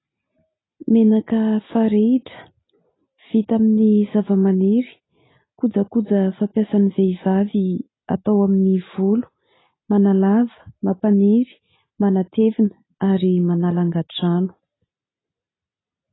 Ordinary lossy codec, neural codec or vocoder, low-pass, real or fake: AAC, 16 kbps; none; 7.2 kHz; real